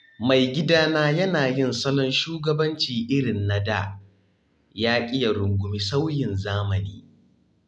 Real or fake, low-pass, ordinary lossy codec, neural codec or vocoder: real; none; none; none